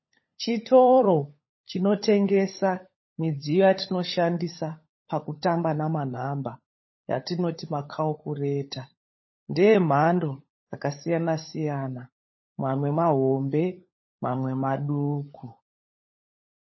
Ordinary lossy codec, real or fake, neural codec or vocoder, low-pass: MP3, 24 kbps; fake; codec, 16 kHz, 16 kbps, FunCodec, trained on LibriTTS, 50 frames a second; 7.2 kHz